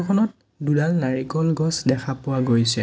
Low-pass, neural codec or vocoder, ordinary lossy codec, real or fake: none; none; none; real